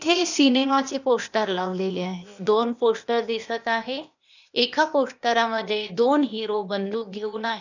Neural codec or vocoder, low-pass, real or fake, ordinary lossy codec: codec, 16 kHz, 0.8 kbps, ZipCodec; 7.2 kHz; fake; none